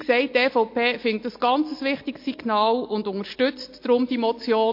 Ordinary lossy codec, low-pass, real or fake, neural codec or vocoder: MP3, 32 kbps; 5.4 kHz; real; none